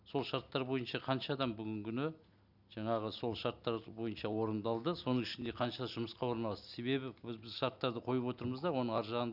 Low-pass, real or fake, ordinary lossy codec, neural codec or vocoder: 5.4 kHz; real; none; none